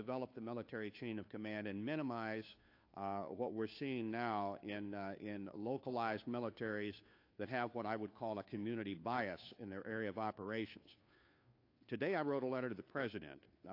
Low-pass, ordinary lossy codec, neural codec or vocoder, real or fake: 5.4 kHz; AAC, 32 kbps; codec, 16 kHz, 2 kbps, FunCodec, trained on Chinese and English, 25 frames a second; fake